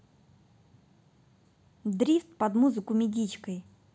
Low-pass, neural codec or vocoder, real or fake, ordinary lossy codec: none; none; real; none